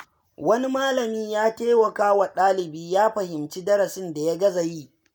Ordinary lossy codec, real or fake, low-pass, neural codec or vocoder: none; real; none; none